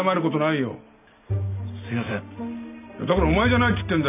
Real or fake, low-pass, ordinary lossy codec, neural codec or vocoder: real; 3.6 kHz; none; none